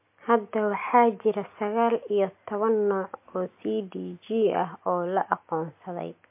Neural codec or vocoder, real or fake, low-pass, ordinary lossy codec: none; real; 3.6 kHz; MP3, 24 kbps